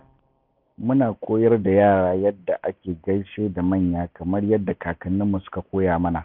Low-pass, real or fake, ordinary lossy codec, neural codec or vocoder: 5.4 kHz; real; none; none